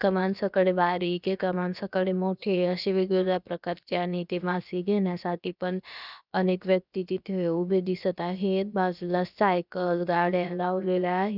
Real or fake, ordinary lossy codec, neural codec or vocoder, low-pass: fake; none; codec, 16 kHz, about 1 kbps, DyCAST, with the encoder's durations; 5.4 kHz